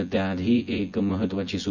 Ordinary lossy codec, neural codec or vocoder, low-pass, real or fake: none; vocoder, 24 kHz, 100 mel bands, Vocos; 7.2 kHz; fake